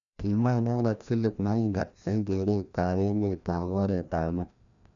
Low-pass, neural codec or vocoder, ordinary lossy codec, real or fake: 7.2 kHz; codec, 16 kHz, 1 kbps, FreqCodec, larger model; none; fake